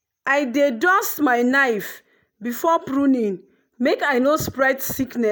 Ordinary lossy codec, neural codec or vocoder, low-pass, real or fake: none; none; none; real